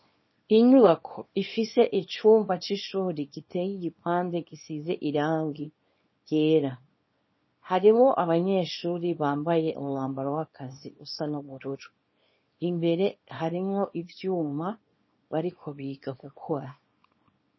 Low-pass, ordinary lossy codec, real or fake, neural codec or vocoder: 7.2 kHz; MP3, 24 kbps; fake; codec, 24 kHz, 0.9 kbps, WavTokenizer, small release